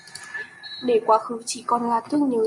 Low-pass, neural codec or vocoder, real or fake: 10.8 kHz; none; real